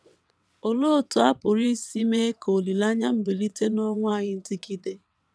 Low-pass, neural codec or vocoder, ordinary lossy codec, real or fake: none; vocoder, 22.05 kHz, 80 mel bands, WaveNeXt; none; fake